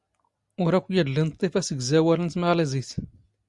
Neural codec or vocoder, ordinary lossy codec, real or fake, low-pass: none; Opus, 64 kbps; real; 10.8 kHz